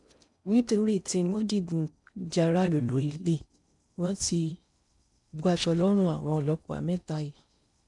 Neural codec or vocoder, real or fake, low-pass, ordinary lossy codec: codec, 16 kHz in and 24 kHz out, 0.6 kbps, FocalCodec, streaming, 4096 codes; fake; 10.8 kHz; MP3, 96 kbps